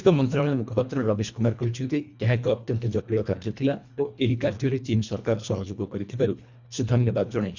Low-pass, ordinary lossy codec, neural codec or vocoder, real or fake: 7.2 kHz; none; codec, 24 kHz, 1.5 kbps, HILCodec; fake